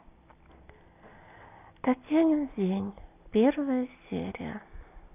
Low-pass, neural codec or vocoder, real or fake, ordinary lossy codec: 3.6 kHz; none; real; none